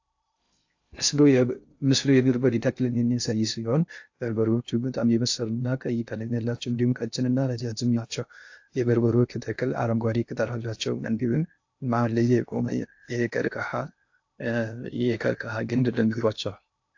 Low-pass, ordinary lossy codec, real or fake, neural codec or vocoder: 7.2 kHz; AAC, 48 kbps; fake; codec, 16 kHz in and 24 kHz out, 0.6 kbps, FocalCodec, streaming, 2048 codes